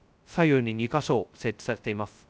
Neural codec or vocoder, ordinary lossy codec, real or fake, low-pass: codec, 16 kHz, 0.2 kbps, FocalCodec; none; fake; none